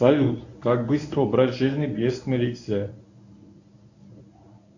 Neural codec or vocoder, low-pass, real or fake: codec, 24 kHz, 0.9 kbps, WavTokenizer, medium speech release version 1; 7.2 kHz; fake